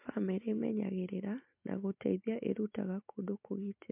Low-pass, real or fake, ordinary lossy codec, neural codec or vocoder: 3.6 kHz; real; MP3, 32 kbps; none